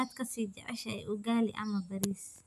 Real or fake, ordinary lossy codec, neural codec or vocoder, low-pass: real; none; none; 14.4 kHz